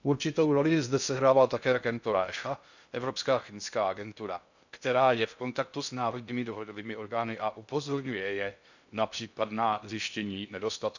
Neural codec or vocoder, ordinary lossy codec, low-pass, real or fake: codec, 16 kHz in and 24 kHz out, 0.6 kbps, FocalCodec, streaming, 2048 codes; none; 7.2 kHz; fake